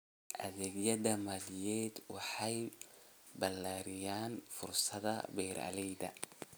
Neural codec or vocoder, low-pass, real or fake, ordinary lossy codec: none; none; real; none